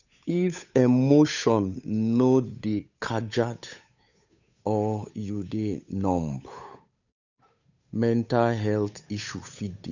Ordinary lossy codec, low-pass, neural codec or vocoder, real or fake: none; 7.2 kHz; codec, 16 kHz, 8 kbps, FunCodec, trained on Chinese and English, 25 frames a second; fake